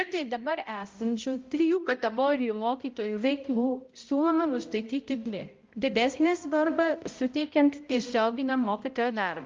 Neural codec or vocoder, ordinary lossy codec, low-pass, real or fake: codec, 16 kHz, 0.5 kbps, X-Codec, HuBERT features, trained on balanced general audio; Opus, 24 kbps; 7.2 kHz; fake